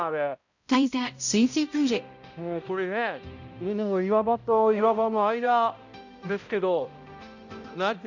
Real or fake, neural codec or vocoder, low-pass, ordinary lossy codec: fake; codec, 16 kHz, 0.5 kbps, X-Codec, HuBERT features, trained on balanced general audio; 7.2 kHz; none